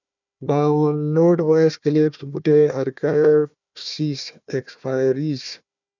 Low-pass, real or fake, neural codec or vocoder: 7.2 kHz; fake; codec, 16 kHz, 1 kbps, FunCodec, trained on Chinese and English, 50 frames a second